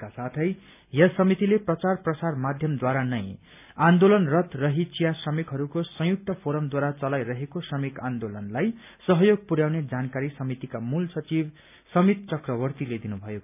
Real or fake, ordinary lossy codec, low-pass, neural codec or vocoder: real; none; 3.6 kHz; none